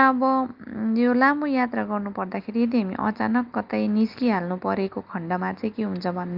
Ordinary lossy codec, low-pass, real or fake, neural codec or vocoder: Opus, 24 kbps; 5.4 kHz; real; none